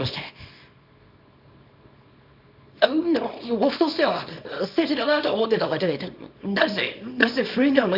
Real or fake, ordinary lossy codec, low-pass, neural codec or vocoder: fake; none; 5.4 kHz; codec, 24 kHz, 0.9 kbps, WavTokenizer, small release